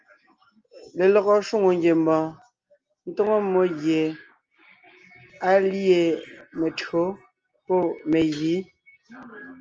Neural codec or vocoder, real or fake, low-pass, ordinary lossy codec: none; real; 7.2 kHz; Opus, 24 kbps